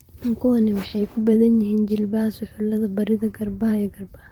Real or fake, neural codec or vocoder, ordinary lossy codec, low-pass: fake; vocoder, 44.1 kHz, 128 mel bands, Pupu-Vocoder; Opus, 24 kbps; 19.8 kHz